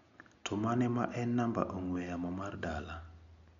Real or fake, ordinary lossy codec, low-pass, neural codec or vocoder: real; none; 7.2 kHz; none